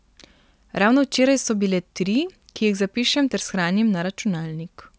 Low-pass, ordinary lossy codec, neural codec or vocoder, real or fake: none; none; none; real